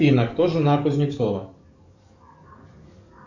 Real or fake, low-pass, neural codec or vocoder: fake; 7.2 kHz; codec, 44.1 kHz, 7.8 kbps, DAC